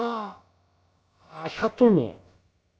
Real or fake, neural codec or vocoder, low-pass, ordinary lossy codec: fake; codec, 16 kHz, about 1 kbps, DyCAST, with the encoder's durations; none; none